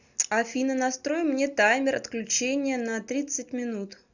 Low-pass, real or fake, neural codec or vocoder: 7.2 kHz; real; none